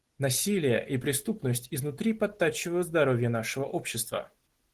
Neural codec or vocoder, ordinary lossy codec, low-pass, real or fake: none; Opus, 16 kbps; 14.4 kHz; real